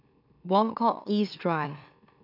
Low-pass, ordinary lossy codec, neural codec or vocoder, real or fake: 5.4 kHz; none; autoencoder, 44.1 kHz, a latent of 192 numbers a frame, MeloTTS; fake